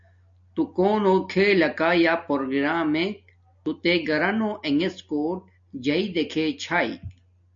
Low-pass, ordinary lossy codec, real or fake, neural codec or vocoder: 7.2 kHz; MP3, 48 kbps; real; none